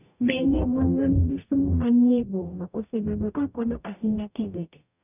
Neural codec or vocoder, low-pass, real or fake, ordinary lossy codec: codec, 44.1 kHz, 0.9 kbps, DAC; 3.6 kHz; fake; none